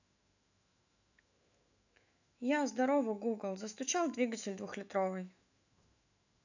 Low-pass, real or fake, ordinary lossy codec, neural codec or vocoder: 7.2 kHz; fake; none; autoencoder, 48 kHz, 128 numbers a frame, DAC-VAE, trained on Japanese speech